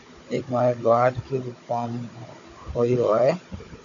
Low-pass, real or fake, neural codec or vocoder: 7.2 kHz; fake; codec, 16 kHz, 16 kbps, FunCodec, trained on Chinese and English, 50 frames a second